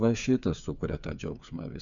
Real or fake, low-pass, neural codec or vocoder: fake; 7.2 kHz; codec, 16 kHz, 8 kbps, FreqCodec, smaller model